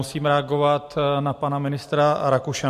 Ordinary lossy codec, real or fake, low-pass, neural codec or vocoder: MP3, 64 kbps; real; 14.4 kHz; none